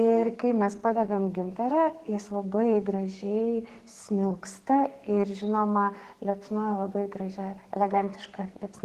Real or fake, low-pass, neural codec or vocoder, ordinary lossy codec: fake; 14.4 kHz; codec, 44.1 kHz, 2.6 kbps, SNAC; Opus, 16 kbps